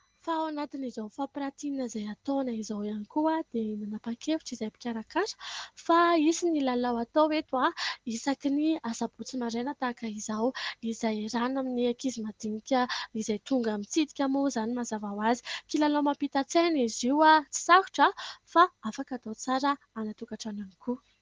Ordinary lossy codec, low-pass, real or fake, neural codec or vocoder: Opus, 16 kbps; 7.2 kHz; real; none